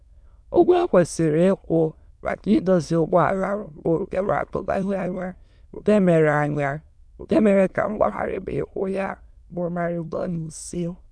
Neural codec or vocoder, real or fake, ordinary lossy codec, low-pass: autoencoder, 22.05 kHz, a latent of 192 numbers a frame, VITS, trained on many speakers; fake; none; none